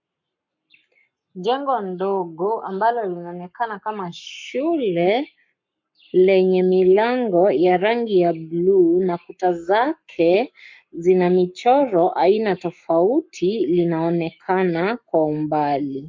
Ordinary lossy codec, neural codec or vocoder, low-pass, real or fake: MP3, 48 kbps; codec, 44.1 kHz, 7.8 kbps, Pupu-Codec; 7.2 kHz; fake